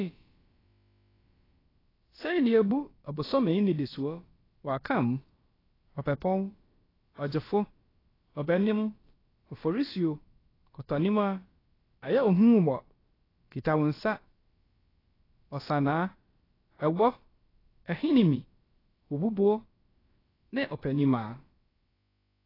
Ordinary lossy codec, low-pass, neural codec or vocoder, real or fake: AAC, 24 kbps; 5.4 kHz; codec, 16 kHz, about 1 kbps, DyCAST, with the encoder's durations; fake